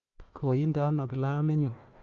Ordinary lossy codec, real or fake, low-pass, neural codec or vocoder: Opus, 24 kbps; fake; 7.2 kHz; codec, 16 kHz, 1 kbps, FunCodec, trained on Chinese and English, 50 frames a second